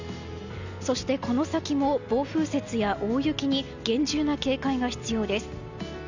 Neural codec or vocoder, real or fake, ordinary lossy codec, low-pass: none; real; none; 7.2 kHz